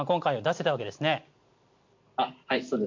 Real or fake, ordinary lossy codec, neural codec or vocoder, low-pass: real; MP3, 48 kbps; none; 7.2 kHz